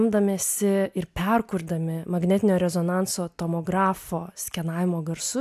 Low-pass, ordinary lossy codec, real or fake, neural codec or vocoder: 14.4 kHz; AAC, 96 kbps; real; none